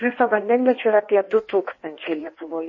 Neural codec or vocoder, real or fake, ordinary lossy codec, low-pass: codec, 16 kHz in and 24 kHz out, 1.1 kbps, FireRedTTS-2 codec; fake; MP3, 32 kbps; 7.2 kHz